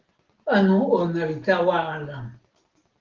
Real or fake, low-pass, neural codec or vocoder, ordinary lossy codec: real; 7.2 kHz; none; Opus, 16 kbps